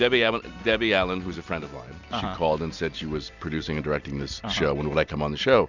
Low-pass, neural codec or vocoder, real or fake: 7.2 kHz; none; real